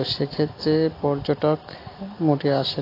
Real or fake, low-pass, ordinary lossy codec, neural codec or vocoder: real; 5.4 kHz; AAC, 24 kbps; none